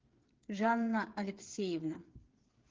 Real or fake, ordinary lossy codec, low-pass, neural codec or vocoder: fake; Opus, 16 kbps; 7.2 kHz; codec, 16 kHz, 8 kbps, FreqCodec, smaller model